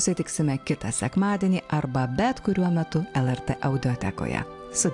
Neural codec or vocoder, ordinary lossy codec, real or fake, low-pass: none; AAC, 64 kbps; real; 10.8 kHz